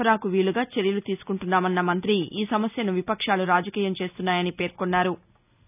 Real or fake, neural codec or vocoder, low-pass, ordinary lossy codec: real; none; 3.6 kHz; none